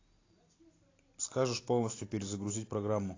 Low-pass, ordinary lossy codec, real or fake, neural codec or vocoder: 7.2 kHz; AAC, 32 kbps; real; none